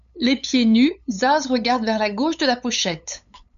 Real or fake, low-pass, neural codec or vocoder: fake; 7.2 kHz; codec, 16 kHz, 8 kbps, FunCodec, trained on LibriTTS, 25 frames a second